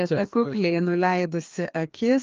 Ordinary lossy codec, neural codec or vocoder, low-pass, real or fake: Opus, 24 kbps; codec, 16 kHz, 2 kbps, FreqCodec, larger model; 7.2 kHz; fake